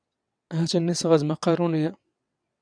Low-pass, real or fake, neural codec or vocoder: 9.9 kHz; fake; vocoder, 22.05 kHz, 80 mel bands, WaveNeXt